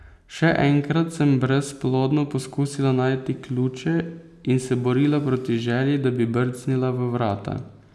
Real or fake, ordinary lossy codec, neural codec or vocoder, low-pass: real; none; none; none